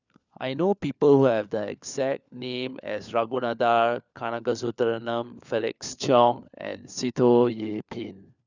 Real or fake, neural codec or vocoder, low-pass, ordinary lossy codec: fake; codec, 16 kHz, 4 kbps, FunCodec, trained on LibriTTS, 50 frames a second; 7.2 kHz; none